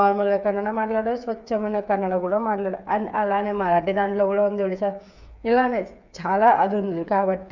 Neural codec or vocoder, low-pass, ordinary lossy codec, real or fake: codec, 16 kHz, 8 kbps, FreqCodec, smaller model; 7.2 kHz; none; fake